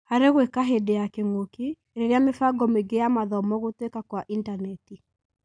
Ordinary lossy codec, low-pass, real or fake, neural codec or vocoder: none; 9.9 kHz; real; none